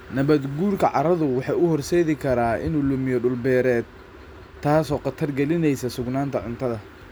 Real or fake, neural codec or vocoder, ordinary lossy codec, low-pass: real; none; none; none